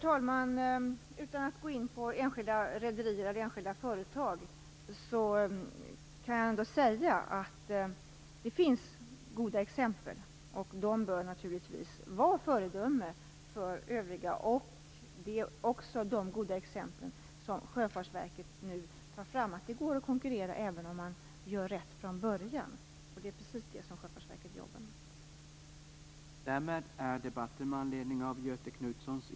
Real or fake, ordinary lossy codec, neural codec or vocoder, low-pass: real; none; none; none